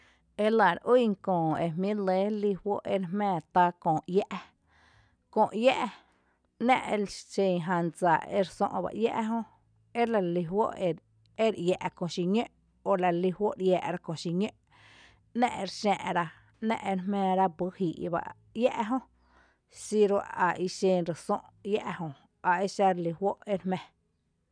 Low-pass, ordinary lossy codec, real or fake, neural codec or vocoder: 9.9 kHz; none; real; none